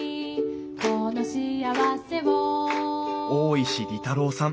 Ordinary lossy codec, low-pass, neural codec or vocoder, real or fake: none; none; none; real